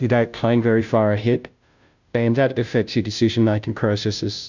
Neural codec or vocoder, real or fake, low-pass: codec, 16 kHz, 0.5 kbps, FunCodec, trained on Chinese and English, 25 frames a second; fake; 7.2 kHz